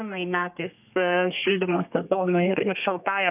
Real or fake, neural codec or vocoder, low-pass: fake; codec, 32 kHz, 1.9 kbps, SNAC; 3.6 kHz